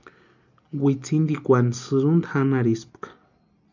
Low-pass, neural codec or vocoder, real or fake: 7.2 kHz; none; real